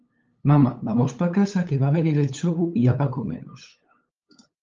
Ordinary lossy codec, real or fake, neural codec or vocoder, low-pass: Opus, 24 kbps; fake; codec, 16 kHz, 8 kbps, FunCodec, trained on LibriTTS, 25 frames a second; 7.2 kHz